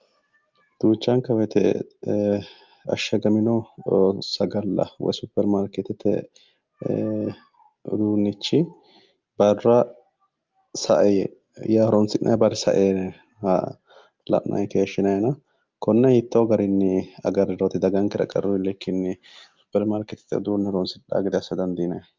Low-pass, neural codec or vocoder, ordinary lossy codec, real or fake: 7.2 kHz; none; Opus, 24 kbps; real